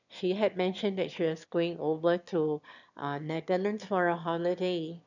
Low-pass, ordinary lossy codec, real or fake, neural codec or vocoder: 7.2 kHz; none; fake; autoencoder, 22.05 kHz, a latent of 192 numbers a frame, VITS, trained on one speaker